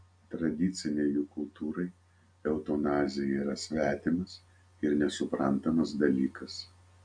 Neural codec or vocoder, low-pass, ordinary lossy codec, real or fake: none; 9.9 kHz; AAC, 64 kbps; real